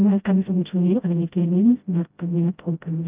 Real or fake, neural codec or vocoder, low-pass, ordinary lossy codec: fake; codec, 16 kHz, 0.5 kbps, FreqCodec, smaller model; 3.6 kHz; Opus, 24 kbps